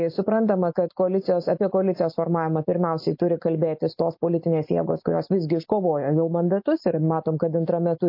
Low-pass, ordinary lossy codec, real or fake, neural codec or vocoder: 5.4 kHz; MP3, 32 kbps; real; none